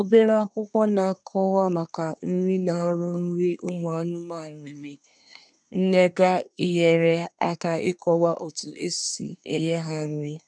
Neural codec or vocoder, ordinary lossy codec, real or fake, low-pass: codec, 24 kHz, 1 kbps, SNAC; none; fake; 9.9 kHz